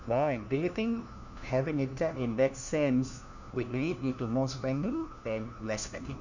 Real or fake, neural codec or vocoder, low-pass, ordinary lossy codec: fake; codec, 16 kHz, 1 kbps, FunCodec, trained on LibriTTS, 50 frames a second; 7.2 kHz; none